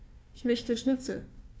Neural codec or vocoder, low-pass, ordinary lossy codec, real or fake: codec, 16 kHz, 1 kbps, FunCodec, trained on Chinese and English, 50 frames a second; none; none; fake